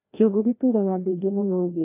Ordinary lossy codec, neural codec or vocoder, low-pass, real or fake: AAC, 32 kbps; codec, 16 kHz, 1 kbps, FreqCodec, larger model; 3.6 kHz; fake